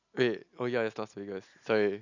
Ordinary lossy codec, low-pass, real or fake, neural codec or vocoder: none; 7.2 kHz; real; none